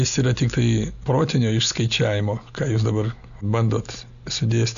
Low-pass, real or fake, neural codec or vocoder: 7.2 kHz; real; none